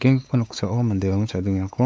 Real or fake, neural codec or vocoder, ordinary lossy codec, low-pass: fake; codec, 16 kHz, 4 kbps, X-Codec, WavLM features, trained on Multilingual LibriSpeech; none; none